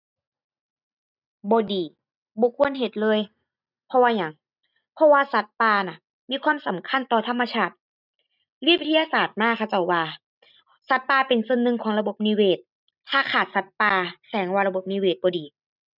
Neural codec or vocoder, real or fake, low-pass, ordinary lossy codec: none; real; 5.4 kHz; none